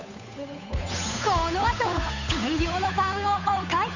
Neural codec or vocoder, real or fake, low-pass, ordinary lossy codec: codec, 16 kHz, 8 kbps, FunCodec, trained on Chinese and English, 25 frames a second; fake; 7.2 kHz; none